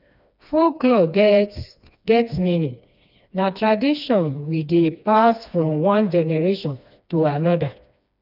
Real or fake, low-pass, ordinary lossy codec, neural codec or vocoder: fake; 5.4 kHz; none; codec, 16 kHz, 2 kbps, FreqCodec, smaller model